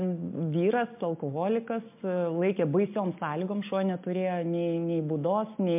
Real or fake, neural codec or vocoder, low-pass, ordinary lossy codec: real; none; 3.6 kHz; MP3, 32 kbps